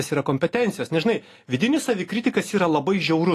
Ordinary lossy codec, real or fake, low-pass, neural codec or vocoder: AAC, 48 kbps; real; 14.4 kHz; none